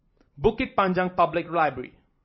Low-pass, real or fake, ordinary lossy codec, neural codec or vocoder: 7.2 kHz; fake; MP3, 24 kbps; vocoder, 44.1 kHz, 128 mel bands every 256 samples, BigVGAN v2